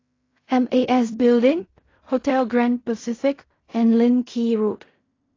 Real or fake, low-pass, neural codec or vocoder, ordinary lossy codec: fake; 7.2 kHz; codec, 16 kHz in and 24 kHz out, 0.4 kbps, LongCat-Audio-Codec, fine tuned four codebook decoder; AAC, 32 kbps